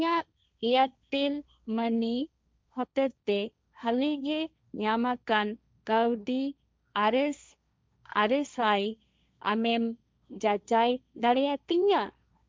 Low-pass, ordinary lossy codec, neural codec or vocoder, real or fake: none; none; codec, 16 kHz, 1.1 kbps, Voila-Tokenizer; fake